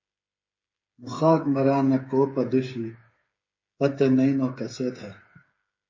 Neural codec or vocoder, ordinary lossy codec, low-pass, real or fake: codec, 16 kHz, 8 kbps, FreqCodec, smaller model; MP3, 32 kbps; 7.2 kHz; fake